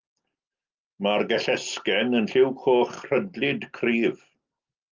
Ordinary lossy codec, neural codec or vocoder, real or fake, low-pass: Opus, 24 kbps; none; real; 7.2 kHz